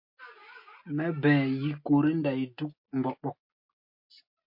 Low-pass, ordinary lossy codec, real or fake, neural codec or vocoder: 5.4 kHz; MP3, 32 kbps; real; none